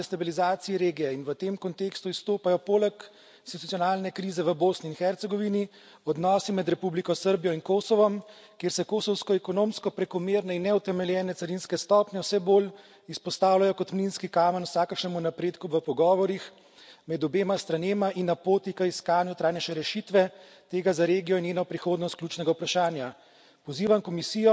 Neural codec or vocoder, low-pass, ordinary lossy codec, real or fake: none; none; none; real